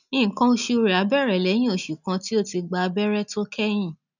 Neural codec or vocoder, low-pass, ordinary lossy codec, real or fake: none; 7.2 kHz; none; real